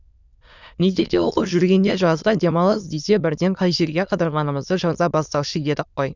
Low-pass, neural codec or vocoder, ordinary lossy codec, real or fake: 7.2 kHz; autoencoder, 22.05 kHz, a latent of 192 numbers a frame, VITS, trained on many speakers; none; fake